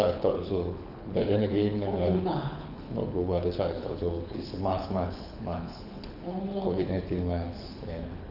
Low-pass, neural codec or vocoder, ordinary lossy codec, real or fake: 5.4 kHz; codec, 24 kHz, 6 kbps, HILCodec; none; fake